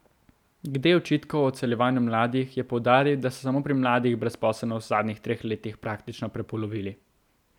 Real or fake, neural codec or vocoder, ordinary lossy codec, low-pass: real; none; none; 19.8 kHz